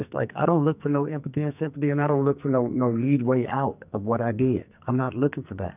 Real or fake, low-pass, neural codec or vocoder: fake; 3.6 kHz; codec, 44.1 kHz, 2.6 kbps, SNAC